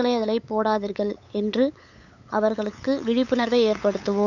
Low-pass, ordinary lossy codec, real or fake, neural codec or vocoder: 7.2 kHz; none; fake; codec, 16 kHz, 8 kbps, FunCodec, trained on LibriTTS, 25 frames a second